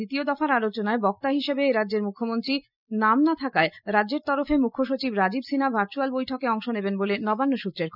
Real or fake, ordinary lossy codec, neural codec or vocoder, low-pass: real; none; none; 5.4 kHz